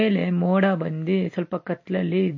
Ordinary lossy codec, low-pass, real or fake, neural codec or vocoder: MP3, 32 kbps; 7.2 kHz; real; none